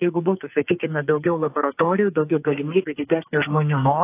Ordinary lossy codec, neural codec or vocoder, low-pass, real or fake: AAC, 24 kbps; codec, 44.1 kHz, 2.6 kbps, SNAC; 3.6 kHz; fake